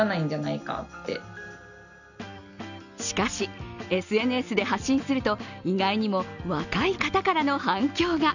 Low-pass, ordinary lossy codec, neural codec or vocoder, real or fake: 7.2 kHz; none; none; real